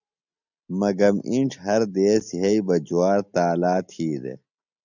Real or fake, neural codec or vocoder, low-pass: real; none; 7.2 kHz